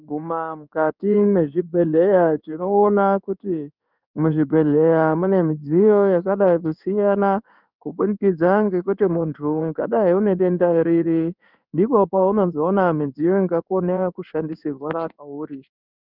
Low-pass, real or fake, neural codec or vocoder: 5.4 kHz; fake; codec, 16 kHz in and 24 kHz out, 1 kbps, XY-Tokenizer